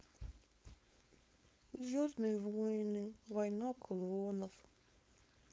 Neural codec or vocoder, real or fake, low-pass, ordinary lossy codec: codec, 16 kHz, 4.8 kbps, FACodec; fake; none; none